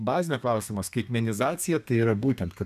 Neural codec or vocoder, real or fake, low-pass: codec, 32 kHz, 1.9 kbps, SNAC; fake; 14.4 kHz